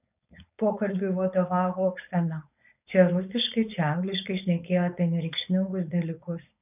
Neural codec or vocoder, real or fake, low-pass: codec, 16 kHz, 4.8 kbps, FACodec; fake; 3.6 kHz